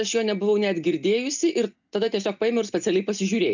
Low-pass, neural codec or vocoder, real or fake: 7.2 kHz; none; real